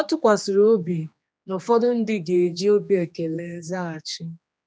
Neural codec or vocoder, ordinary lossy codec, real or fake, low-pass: codec, 16 kHz, 2 kbps, X-Codec, HuBERT features, trained on general audio; none; fake; none